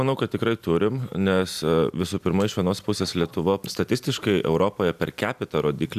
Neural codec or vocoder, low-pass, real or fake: none; 14.4 kHz; real